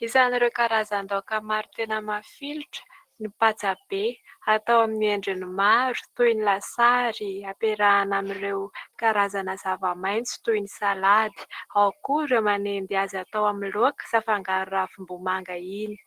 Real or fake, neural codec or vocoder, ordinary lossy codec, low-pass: real; none; Opus, 16 kbps; 14.4 kHz